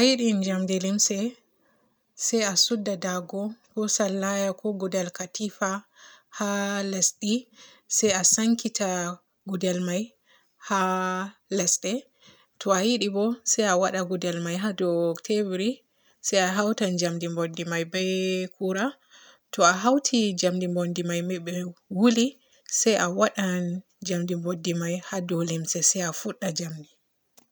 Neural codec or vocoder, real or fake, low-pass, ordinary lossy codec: none; real; none; none